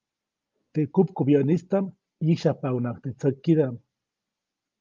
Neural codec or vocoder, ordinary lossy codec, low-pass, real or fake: none; Opus, 32 kbps; 7.2 kHz; real